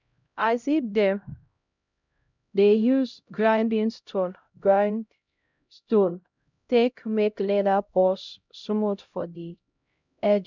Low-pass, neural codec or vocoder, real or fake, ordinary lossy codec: 7.2 kHz; codec, 16 kHz, 0.5 kbps, X-Codec, HuBERT features, trained on LibriSpeech; fake; none